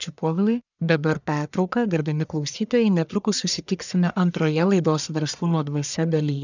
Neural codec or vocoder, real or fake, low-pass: codec, 44.1 kHz, 1.7 kbps, Pupu-Codec; fake; 7.2 kHz